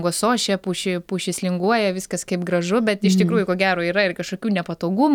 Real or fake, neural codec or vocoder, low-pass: real; none; 19.8 kHz